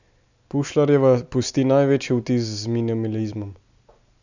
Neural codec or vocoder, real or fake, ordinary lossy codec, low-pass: none; real; none; 7.2 kHz